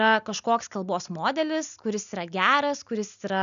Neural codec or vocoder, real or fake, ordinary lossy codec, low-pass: none; real; MP3, 96 kbps; 7.2 kHz